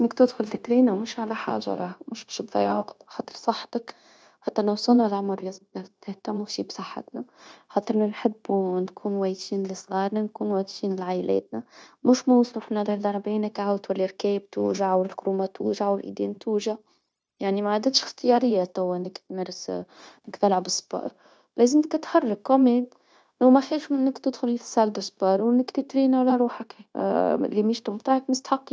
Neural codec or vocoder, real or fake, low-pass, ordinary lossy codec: codec, 16 kHz, 0.9 kbps, LongCat-Audio-Codec; fake; none; none